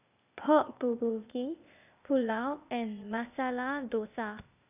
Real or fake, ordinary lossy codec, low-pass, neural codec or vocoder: fake; none; 3.6 kHz; codec, 16 kHz, 0.8 kbps, ZipCodec